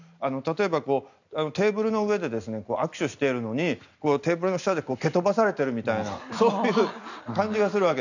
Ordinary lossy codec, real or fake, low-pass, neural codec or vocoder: none; real; 7.2 kHz; none